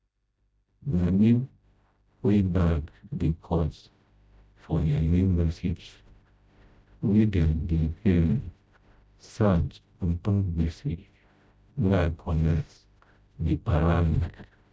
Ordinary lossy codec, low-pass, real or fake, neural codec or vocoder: none; none; fake; codec, 16 kHz, 0.5 kbps, FreqCodec, smaller model